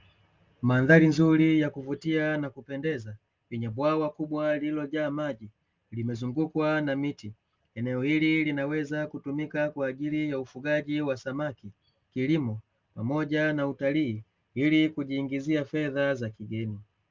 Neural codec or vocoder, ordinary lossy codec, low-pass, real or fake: none; Opus, 24 kbps; 7.2 kHz; real